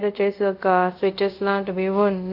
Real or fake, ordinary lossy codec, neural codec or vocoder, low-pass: fake; none; codec, 24 kHz, 0.5 kbps, DualCodec; 5.4 kHz